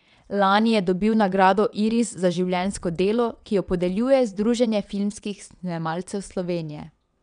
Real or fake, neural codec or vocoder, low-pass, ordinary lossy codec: fake; vocoder, 22.05 kHz, 80 mel bands, WaveNeXt; 9.9 kHz; none